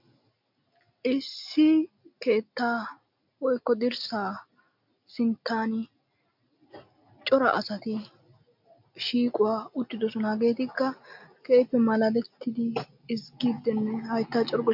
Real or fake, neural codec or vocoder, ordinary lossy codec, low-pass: real; none; MP3, 48 kbps; 5.4 kHz